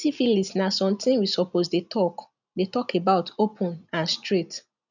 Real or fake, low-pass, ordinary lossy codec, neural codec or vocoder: real; 7.2 kHz; none; none